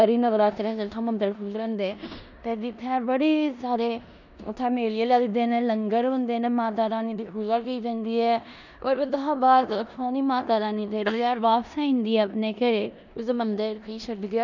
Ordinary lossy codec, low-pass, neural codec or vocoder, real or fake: none; 7.2 kHz; codec, 16 kHz in and 24 kHz out, 0.9 kbps, LongCat-Audio-Codec, four codebook decoder; fake